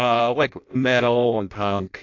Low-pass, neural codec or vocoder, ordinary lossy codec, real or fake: 7.2 kHz; codec, 16 kHz in and 24 kHz out, 0.6 kbps, FireRedTTS-2 codec; MP3, 64 kbps; fake